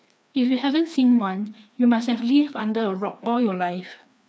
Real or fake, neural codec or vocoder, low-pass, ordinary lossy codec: fake; codec, 16 kHz, 2 kbps, FreqCodec, larger model; none; none